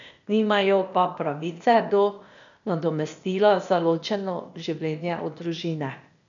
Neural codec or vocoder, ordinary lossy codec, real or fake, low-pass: codec, 16 kHz, 0.8 kbps, ZipCodec; none; fake; 7.2 kHz